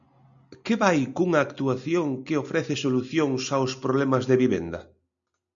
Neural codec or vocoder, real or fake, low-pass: none; real; 7.2 kHz